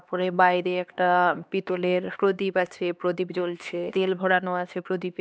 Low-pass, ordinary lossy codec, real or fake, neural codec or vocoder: none; none; fake; codec, 16 kHz, 2 kbps, X-Codec, HuBERT features, trained on LibriSpeech